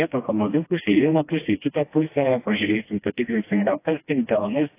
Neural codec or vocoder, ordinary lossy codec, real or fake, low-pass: codec, 16 kHz, 1 kbps, FreqCodec, smaller model; AAC, 24 kbps; fake; 3.6 kHz